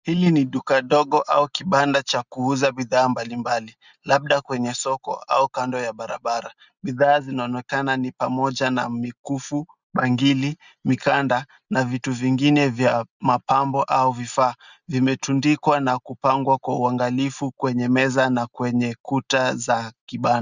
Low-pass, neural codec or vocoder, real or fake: 7.2 kHz; none; real